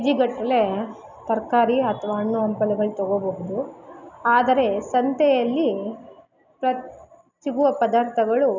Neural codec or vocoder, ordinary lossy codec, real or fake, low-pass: none; none; real; 7.2 kHz